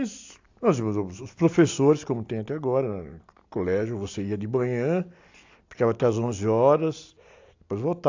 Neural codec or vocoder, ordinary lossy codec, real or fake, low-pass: none; AAC, 48 kbps; real; 7.2 kHz